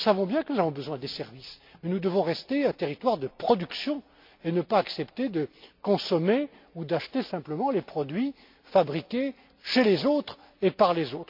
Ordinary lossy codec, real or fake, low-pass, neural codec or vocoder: MP3, 48 kbps; real; 5.4 kHz; none